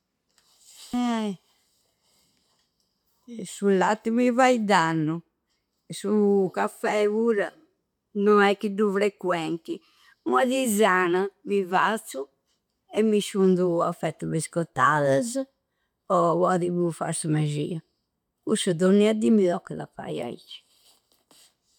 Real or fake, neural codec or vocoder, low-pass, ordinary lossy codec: real; none; 19.8 kHz; none